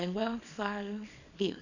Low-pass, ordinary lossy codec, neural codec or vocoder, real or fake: 7.2 kHz; none; codec, 24 kHz, 0.9 kbps, WavTokenizer, small release; fake